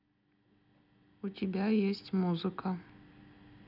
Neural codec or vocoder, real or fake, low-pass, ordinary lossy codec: none; real; 5.4 kHz; none